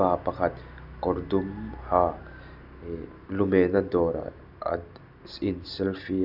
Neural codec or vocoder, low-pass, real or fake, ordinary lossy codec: none; 5.4 kHz; real; none